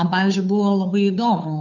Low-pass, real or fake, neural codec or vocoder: 7.2 kHz; fake; codec, 16 kHz, 2 kbps, FunCodec, trained on Chinese and English, 25 frames a second